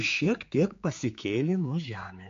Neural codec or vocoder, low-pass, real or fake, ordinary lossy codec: codec, 16 kHz, 4 kbps, X-Codec, HuBERT features, trained on general audio; 7.2 kHz; fake; MP3, 48 kbps